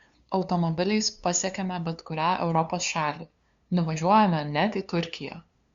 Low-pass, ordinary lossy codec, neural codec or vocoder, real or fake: 7.2 kHz; Opus, 64 kbps; codec, 16 kHz, 2 kbps, FunCodec, trained on LibriTTS, 25 frames a second; fake